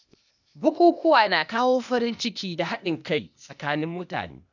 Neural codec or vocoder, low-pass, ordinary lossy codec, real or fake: codec, 16 kHz, 0.8 kbps, ZipCodec; 7.2 kHz; none; fake